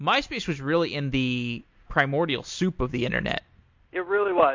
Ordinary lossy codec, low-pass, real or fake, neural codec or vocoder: MP3, 48 kbps; 7.2 kHz; real; none